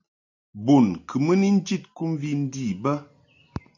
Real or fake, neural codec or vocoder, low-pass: real; none; 7.2 kHz